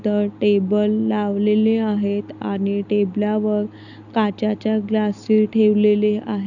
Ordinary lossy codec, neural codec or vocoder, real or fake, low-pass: none; none; real; 7.2 kHz